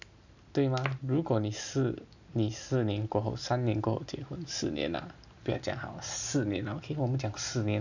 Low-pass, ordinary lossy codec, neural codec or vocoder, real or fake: 7.2 kHz; none; none; real